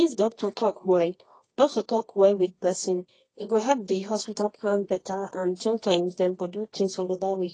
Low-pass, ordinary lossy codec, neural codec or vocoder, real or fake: 10.8 kHz; AAC, 32 kbps; codec, 24 kHz, 0.9 kbps, WavTokenizer, medium music audio release; fake